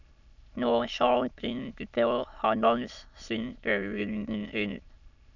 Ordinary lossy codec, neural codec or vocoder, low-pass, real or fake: none; autoencoder, 22.05 kHz, a latent of 192 numbers a frame, VITS, trained on many speakers; 7.2 kHz; fake